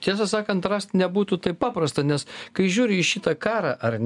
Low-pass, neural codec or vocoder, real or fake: 10.8 kHz; none; real